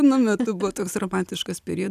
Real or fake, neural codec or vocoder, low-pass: real; none; 14.4 kHz